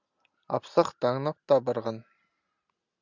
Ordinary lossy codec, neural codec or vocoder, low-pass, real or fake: Opus, 64 kbps; none; 7.2 kHz; real